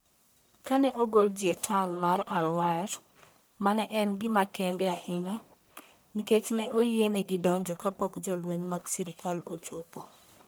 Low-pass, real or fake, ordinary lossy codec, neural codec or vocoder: none; fake; none; codec, 44.1 kHz, 1.7 kbps, Pupu-Codec